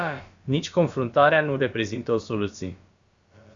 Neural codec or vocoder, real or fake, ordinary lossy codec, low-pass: codec, 16 kHz, about 1 kbps, DyCAST, with the encoder's durations; fake; Opus, 64 kbps; 7.2 kHz